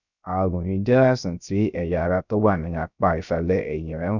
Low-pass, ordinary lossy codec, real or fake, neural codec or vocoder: 7.2 kHz; none; fake; codec, 16 kHz, 0.3 kbps, FocalCodec